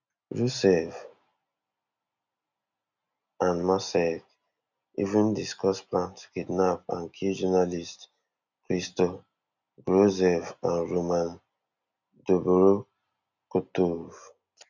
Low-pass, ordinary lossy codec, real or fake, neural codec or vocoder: 7.2 kHz; none; real; none